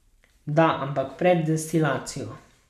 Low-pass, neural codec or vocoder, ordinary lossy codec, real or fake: 14.4 kHz; none; none; real